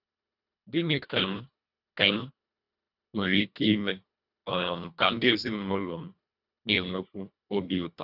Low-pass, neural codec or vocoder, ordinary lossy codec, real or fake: 5.4 kHz; codec, 24 kHz, 1.5 kbps, HILCodec; none; fake